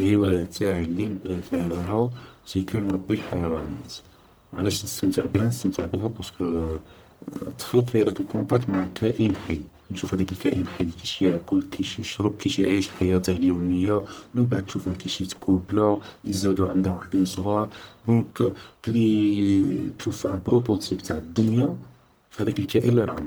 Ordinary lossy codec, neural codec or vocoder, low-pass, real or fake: none; codec, 44.1 kHz, 1.7 kbps, Pupu-Codec; none; fake